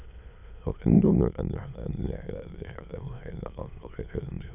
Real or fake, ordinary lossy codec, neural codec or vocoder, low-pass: fake; none; autoencoder, 22.05 kHz, a latent of 192 numbers a frame, VITS, trained on many speakers; 3.6 kHz